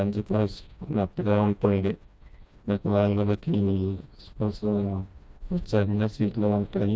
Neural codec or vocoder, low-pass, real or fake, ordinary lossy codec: codec, 16 kHz, 1 kbps, FreqCodec, smaller model; none; fake; none